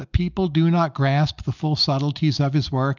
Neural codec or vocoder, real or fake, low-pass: none; real; 7.2 kHz